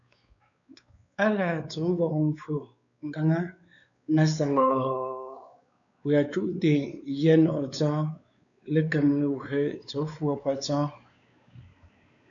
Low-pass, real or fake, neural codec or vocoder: 7.2 kHz; fake; codec, 16 kHz, 4 kbps, X-Codec, WavLM features, trained on Multilingual LibriSpeech